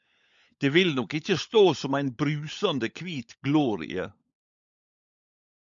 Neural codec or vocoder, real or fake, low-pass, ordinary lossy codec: codec, 16 kHz, 16 kbps, FunCodec, trained on LibriTTS, 50 frames a second; fake; 7.2 kHz; MP3, 64 kbps